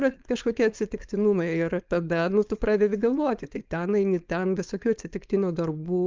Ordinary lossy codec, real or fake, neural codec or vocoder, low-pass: Opus, 24 kbps; fake; codec, 16 kHz, 4.8 kbps, FACodec; 7.2 kHz